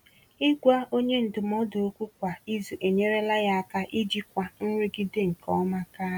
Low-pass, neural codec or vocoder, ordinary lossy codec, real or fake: 19.8 kHz; none; none; real